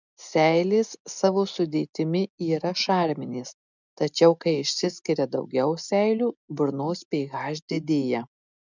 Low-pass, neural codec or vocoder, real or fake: 7.2 kHz; none; real